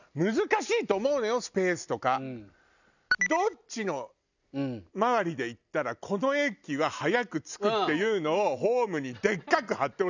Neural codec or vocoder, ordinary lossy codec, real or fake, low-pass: none; none; real; 7.2 kHz